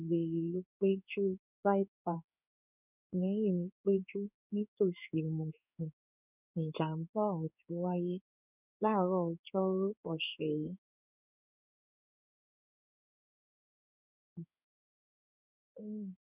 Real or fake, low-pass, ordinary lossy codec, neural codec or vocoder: fake; 3.6 kHz; none; codec, 16 kHz in and 24 kHz out, 1 kbps, XY-Tokenizer